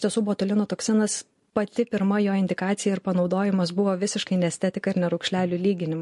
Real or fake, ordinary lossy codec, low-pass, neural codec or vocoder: real; MP3, 48 kbps; 14.4 kHz; none